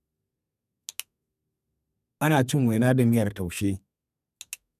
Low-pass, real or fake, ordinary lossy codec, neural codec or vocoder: 14.4 kHz; fake; none; codec, 32 kHz, 1.9 kbps, SNAC